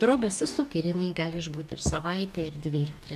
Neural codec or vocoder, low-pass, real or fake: codec, 44.1 kHz, 2.6 kbps, DAC; 14.4 kHz; fake